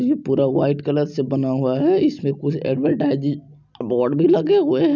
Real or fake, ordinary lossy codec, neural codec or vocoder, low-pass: real; none; none; 7.2 kHz